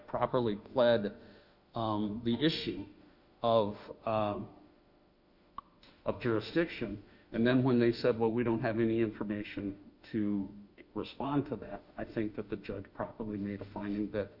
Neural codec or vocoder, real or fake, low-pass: autoencoder, 48 kHz, 32 numbers a frame, DAC-VAE, trained on Japanese speech; fake; 5.4 kHz